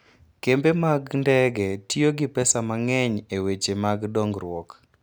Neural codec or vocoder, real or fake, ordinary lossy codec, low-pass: none; real; none; none